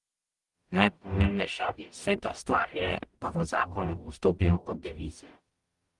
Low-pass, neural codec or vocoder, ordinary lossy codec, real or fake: 10.8 kHz; codec, 44.1 kHz, 0.9 kbps, DAC; Opus, 24 kbps; fake